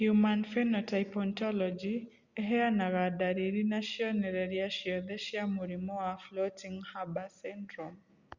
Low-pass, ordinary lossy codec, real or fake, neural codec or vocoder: 7.2 kHz; Opus, 64 kbps; real; none